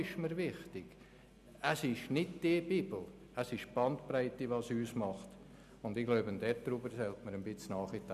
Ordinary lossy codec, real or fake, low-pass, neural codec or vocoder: none; real; 14.4 kHz; none